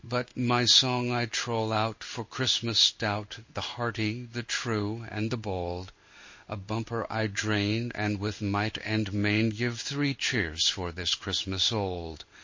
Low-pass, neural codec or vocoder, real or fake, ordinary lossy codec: 7.2 kHz; codec, 16 kHz in and 24 kHz out, 1 kbps, XY-Tokenizer; fake; MP3, 32 kbps